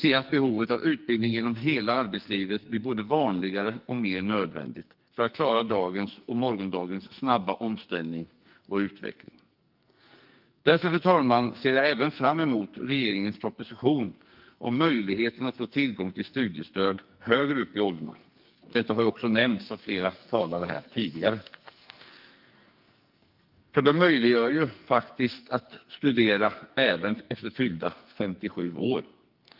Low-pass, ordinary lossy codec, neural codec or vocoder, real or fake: 5.4 kHz; Opus, 24 kbps; codec, 44.1 kHz, 2.6 kbps, SNAC; fake